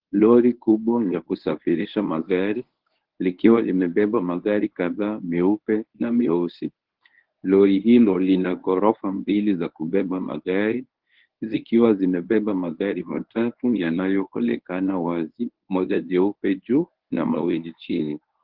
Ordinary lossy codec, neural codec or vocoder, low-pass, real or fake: Opus, 16 kbps; codec, 24 kHz, 0.9 kbps, WavTokenizer, medium speech release version 1; 5.4 kHz; fake